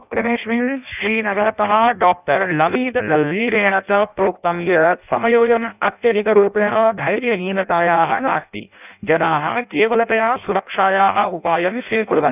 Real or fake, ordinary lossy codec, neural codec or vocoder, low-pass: fake; none; codec, 16 kHz in and 24 kHz out, 0.6 kbps, FireRedTTS-2 codec; 3.6 kHz